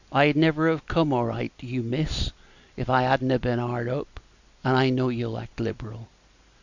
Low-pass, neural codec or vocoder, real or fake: 7.2 kHz; none; real